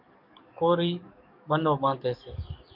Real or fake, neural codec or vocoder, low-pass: fake; codec, 44.1 kHz, 7.8 kbps, DAC; 5.4 kHz